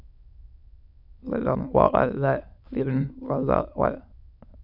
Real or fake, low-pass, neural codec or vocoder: fake; 5.4 kHz; autoencoder, 22.05 kHz, a latent of 192 numbers a frame, VITS, trained on many speakers